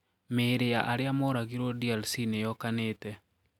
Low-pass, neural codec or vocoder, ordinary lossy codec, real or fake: 19.8 kHz; none; none; real